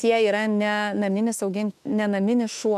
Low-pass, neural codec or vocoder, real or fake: 14.4 kHz; autoencoder, 48 kHz, 32 numbers a frame, DAC-VAE, trained on Japanese speech; fake